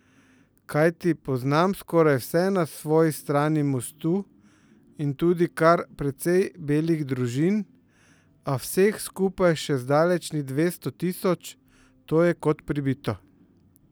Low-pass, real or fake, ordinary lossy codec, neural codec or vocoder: none; real; none; none